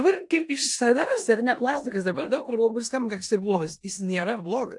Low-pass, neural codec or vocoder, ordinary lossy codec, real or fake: 10.8 kHz; codec, 16 kHz in and 24 kHz out, 0.9 kbps, LongCat-Audio-Codec, four codebook decoder; AAC, 64 kbps; fake